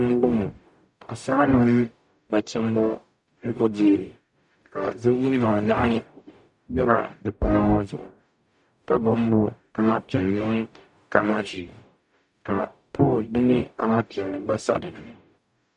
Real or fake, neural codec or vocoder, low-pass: fake; codec, 44.1 kHz, 0.9 kbps, DAC; 10.8 kHz